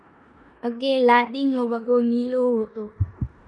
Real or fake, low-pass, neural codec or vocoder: fake; 10.8 kHz; codec, 16 kHz in and 24 kHz out, 0.9 kbps, LongCat-Audio-Codec, four codebook decoder